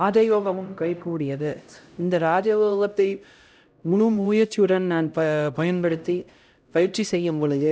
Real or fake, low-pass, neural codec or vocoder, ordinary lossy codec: fake; none; codec, 16 kHz, 0.5 kbps, X-Codec, HuBERT features, trained on LibriSpeech; none